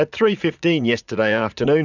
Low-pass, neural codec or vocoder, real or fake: 7.2 kHz; vocoder, 44.1 kHz, 80 mel bands, Vocos; fake